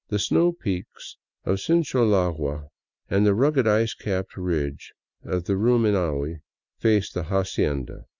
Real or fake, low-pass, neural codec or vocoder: real; 7.2 kHz; none